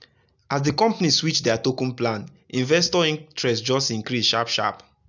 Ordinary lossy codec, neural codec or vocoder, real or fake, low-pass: none; none; real; 7.2 kHz